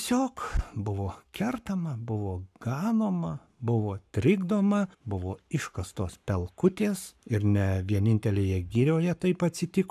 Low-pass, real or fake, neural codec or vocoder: 14.4 kHz; fake; codec, 44.1 kHz, 7.8 kbps, Pupu-Codec